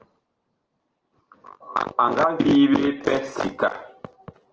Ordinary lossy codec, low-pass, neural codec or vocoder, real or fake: Opus, 16 kbps; 7.2 kHz; none; real